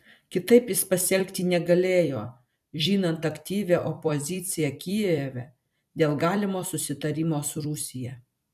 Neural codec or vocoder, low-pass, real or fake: vocoder, 44.1 kHz, 128 mel bands every 256 samples, BigVGAN v2; 14.4 kHz; fake